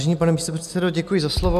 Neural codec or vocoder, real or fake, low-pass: none; real; 14.4 kHz